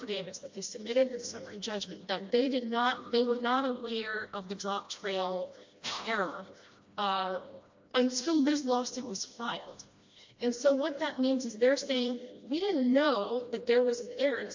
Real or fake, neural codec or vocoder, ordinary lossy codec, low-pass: fake; codec, 16 kHz, 1 kbps, FreqCodec, smaller model; MP3, 48 kbps; 7.2 kHz